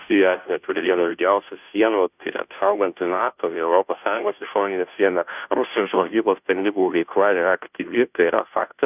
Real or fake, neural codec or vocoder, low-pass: fake; codec, 16 kHz, 0.5 kbps, FunCodec, trained on Chinese and English, 25 frames a second; 3.6 kHz